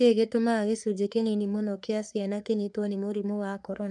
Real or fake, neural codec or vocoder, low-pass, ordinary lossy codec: fake; codec, 44.1 kHz, 3.4 kbps, Pupu-Codec; 10.8 kHz; none